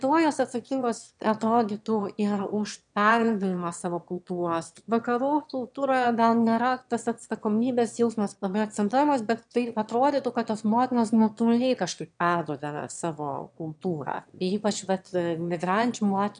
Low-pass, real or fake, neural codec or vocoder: 9.9 kHz; fake; autoencoder, 22.05 kHz, a latent of 192 numbers a frame, VITS, trained on one speaker